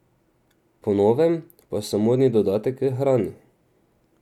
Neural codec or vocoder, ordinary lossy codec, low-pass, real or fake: none; none; 19.8 kHz; real